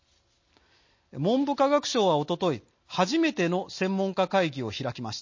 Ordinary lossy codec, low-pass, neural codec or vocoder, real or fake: MP3, 32 kbps; 7.2 kHz; none; real